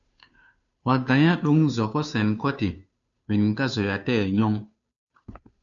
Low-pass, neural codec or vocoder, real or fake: 7.2 kHz; codec, 16 kHz, 2 kbps, FunCodec, trained on Chinese and English, 25 frames a second; fake